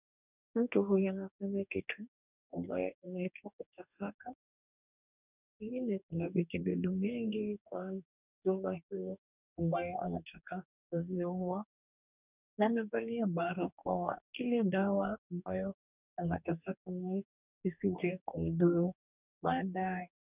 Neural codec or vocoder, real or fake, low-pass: codec, 44.1 kHz, 2.6 kbps, DAC; fake; 3.6 kHz